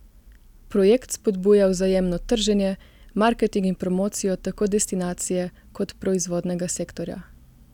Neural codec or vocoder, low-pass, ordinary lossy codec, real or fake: none; 19.8 kHz; none; real